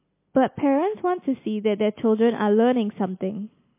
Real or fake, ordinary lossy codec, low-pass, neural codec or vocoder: real; MP3, 24 kbps; 3.6 kHz; none